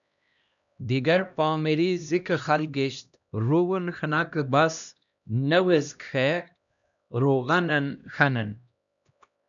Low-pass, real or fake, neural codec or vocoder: 7.2 kHz; fake; codec, 16 kHz, 1 kbps, X-Codec, HuBERT features, trained on LibriSpeech